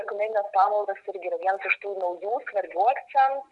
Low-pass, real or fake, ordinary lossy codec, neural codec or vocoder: 10.8 kHz; real; Opus, 64 kbps; none